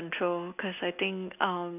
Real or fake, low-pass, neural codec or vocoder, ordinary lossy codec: real; 3.6 kHz; none; none